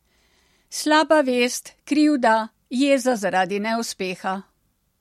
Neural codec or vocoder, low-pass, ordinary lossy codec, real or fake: none; 19.8 kHz; MP3, 64 kbps; real